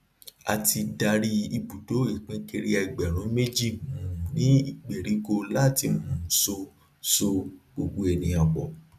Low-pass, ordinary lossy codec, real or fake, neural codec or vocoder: 14.4 kHz; none; real; none